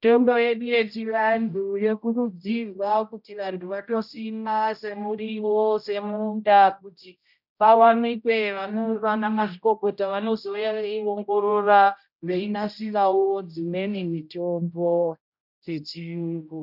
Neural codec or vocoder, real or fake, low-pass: codec, 16 kHz, 0.5 kbps, X-Codec, HuBERT features, trained on general audio; fake; 5.4 kHz